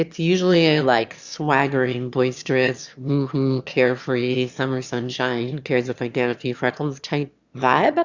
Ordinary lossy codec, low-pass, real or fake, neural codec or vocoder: Opus, 64 kbps; 7.2 kHz; fake; autoencoder, 22.05 kHz, a latent of 192 numbers a frame, VITS, trained on one speaker